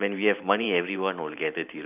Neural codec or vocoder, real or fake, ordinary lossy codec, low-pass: vocoder, 44.1 kHz, 128 mel bands every 512 samples, BigVGAN v2; fake; none; 3.6 kHz